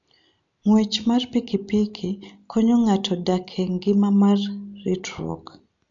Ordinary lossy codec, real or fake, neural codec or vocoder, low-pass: MP3, 64 kbps; real; none; 7.2 kHz